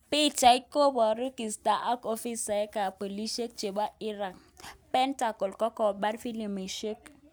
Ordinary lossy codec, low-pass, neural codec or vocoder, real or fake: none; none; none; real